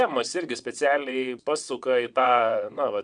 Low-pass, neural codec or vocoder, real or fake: 9.9 kHz; vocoder, 22.05 kHz, 80 mel bands, WaveNeXt; fake